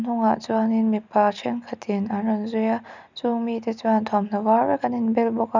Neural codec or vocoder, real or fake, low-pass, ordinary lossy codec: none; real; 7.2 kHz; none